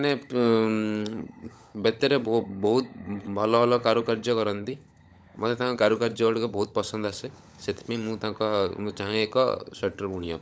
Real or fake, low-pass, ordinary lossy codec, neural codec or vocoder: fake; none; none; codec, 16 kHz, 16 kbps, FunCodec, trained on LibriTTS, 50 frames a second